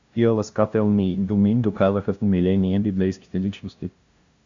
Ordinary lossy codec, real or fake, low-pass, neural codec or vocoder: Opus, 64 kbps; fake; 7.2 kHz; codec, 16 kHz, 0.5 kbps, FunCodec, trained on LibriTTS, 25 frames a second